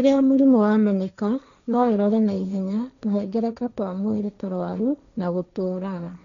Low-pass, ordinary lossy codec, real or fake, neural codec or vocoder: 7.2 kHz; none; fake; codec, 16 kHz, 1.1 kbps, Voila-Tokenizer